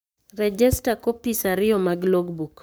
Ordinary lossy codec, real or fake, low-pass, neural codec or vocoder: none; fake; none; codec, 44.1 kHz, 7.8 kbps, Pupu-Codec